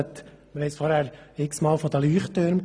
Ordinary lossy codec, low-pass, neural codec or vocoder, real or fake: none; none; none; real